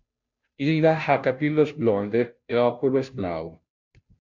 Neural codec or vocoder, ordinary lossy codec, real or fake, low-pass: codec, 16 kHz, 0.5 kbps, FunCodec, trained on Chinese and English, 25 frames a second; MP3, 64 kbps; fake; 7.2 kHz